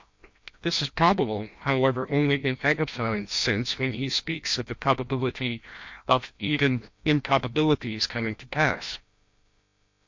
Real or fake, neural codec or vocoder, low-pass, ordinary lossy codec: fake; codec, 16 kHz, 1 kbps, FreqCodec, larger model; 7.2 kHz; MP3, 48 kbps